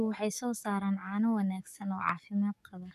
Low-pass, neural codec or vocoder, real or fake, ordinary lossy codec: 14.4 kHz; codec, 44.1 kHz, 7.8 kbps, DAC; fake; none